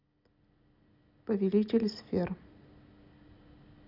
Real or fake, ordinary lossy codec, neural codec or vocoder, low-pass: real; none; none; 5.4 kHz